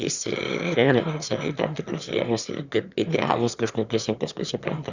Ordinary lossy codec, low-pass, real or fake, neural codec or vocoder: Opus, 64 kbps; 7.2 kHz; fake; autoencoder, 22.05 kHz, a latent of 192 numbers a frame, VITS, trained on one speaker